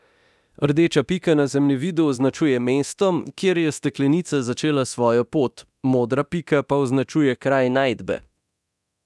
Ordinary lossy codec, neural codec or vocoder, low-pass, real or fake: none; codec, 24 kHz, 0.9 kbps, DualCodec; none; fake